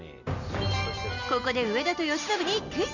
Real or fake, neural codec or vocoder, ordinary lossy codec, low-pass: real; none; none; 7.2 kHz